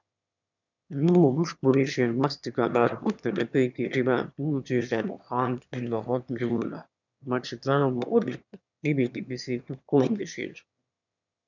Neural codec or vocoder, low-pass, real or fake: autoencoder, 22.05 kHz, a latent of 192 numbers a frame, VITS, trained on one speaker; 7.2 kHz; fake